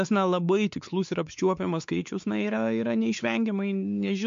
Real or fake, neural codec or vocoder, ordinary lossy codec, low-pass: fake; codec, 16 kHz, 4 kbps, X-Codec, WavLM features, trained on Multilingual LibriSpeech; MP3, 64 kbps; 7.2 kHz